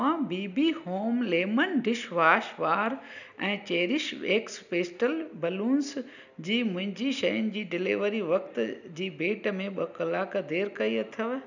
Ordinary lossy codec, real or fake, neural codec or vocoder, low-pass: none; real; none; 7.2 kHz